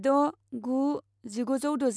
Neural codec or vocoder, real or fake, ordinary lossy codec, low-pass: none; real; none; none